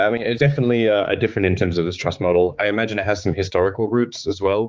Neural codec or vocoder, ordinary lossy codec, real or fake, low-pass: codec, 16 kHz, 4 kbps, X-Codec, HuBERT features, trained on balanced general audio; Opus, 24 kbps; fake; 7.2 kHz